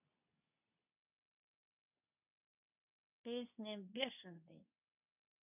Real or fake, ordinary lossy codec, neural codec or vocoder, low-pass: fake; none; codec, 24 kHz, 0.9 kbps, WavTokenizer, medium speech release version 2; 3.6 kHz